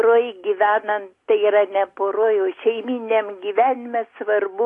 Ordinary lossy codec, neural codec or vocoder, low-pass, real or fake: AAC, 48 kbps; none; 10.8 kHz; real